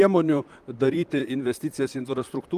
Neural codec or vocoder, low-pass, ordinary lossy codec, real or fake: vocoder, 44.1 kHz, 128 mel bands, Pupu-Vocoder; 14.4 kHz; Opus, 32 kbps; fake